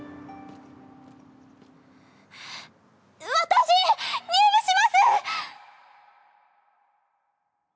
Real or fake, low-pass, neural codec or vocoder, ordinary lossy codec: real; none; none; none